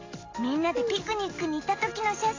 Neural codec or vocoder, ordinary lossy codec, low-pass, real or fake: none; AAC, 32 kbps; 7.2 kHz; real